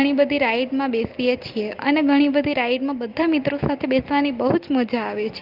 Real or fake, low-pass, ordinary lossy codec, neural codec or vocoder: real; 5.4 kHz; Opus, 24 kbps; none